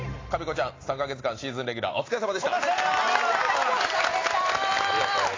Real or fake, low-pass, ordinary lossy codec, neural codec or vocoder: real; 7.2 kHz; AAC, 32 kbps; none